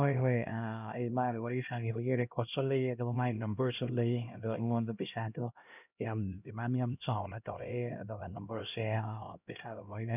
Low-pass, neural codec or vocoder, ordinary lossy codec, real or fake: 3.6 kHz; codec, 16 kHz, 1 kbps, X-Codec, HuBERT features, trained on LibriSpeech; none; fake